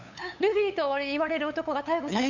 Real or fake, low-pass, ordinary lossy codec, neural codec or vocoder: fake; 7.2 kHz; none; codec, 16 kHz, 8 kbps, FunCodec, trained on LibriTTS, 25 frames a second